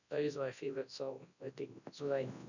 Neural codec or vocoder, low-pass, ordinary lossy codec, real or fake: codec, 24 kHz, 0.9 kbps, WavTokenizer, large speech release; 7.2 kHz; none; fake